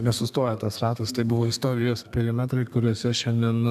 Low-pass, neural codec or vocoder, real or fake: 14.4 kHz; codec, 32 kHz, 1.9 kbps, SNAC; fake